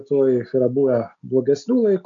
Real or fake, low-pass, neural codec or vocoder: real; 7.2 kHz; none